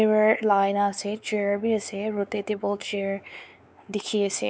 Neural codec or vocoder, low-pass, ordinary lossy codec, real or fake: codec, 16 kHz, 4 kbps, X-Codec, WavLM features, trained on Multilingual LibriSpeech; none; none; fake